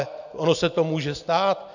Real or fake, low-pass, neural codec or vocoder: real; 7.2 kHz; none